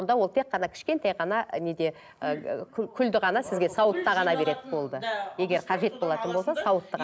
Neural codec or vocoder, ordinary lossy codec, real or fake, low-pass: none; none; real; none